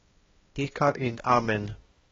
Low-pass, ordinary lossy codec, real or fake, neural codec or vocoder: 7.2 kHz; AAC, 24 kbps; fake; codec, 16 kHz, 1 kbps, X-Codec, HuBERT features, trained on balanced general audio